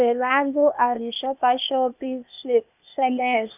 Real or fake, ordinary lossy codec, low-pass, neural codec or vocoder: fake; none; 3.6 kHz; codec, 16 kHz, 0.8 kbps, ZipCodec